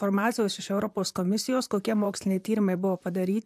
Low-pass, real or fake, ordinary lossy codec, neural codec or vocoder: 14.4 kHz; fake; AAC, 96 kbps; vocoder, 44.1 kHz, 128 mel bands, Pupu-Vocoder